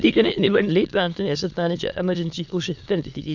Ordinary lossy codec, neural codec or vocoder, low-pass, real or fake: Opus, 64 kbps; autoencoder, 22.05 kHz, a latent of 192 numbers a frame, VITS, trained on many speakers; 7.2 kHz; fake